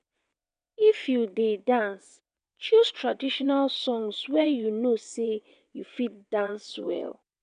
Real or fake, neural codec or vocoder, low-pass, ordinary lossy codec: fake; vocoder, 22.05 kHz, 80 mel bands, WaveNeXt; 9.9 kHz; none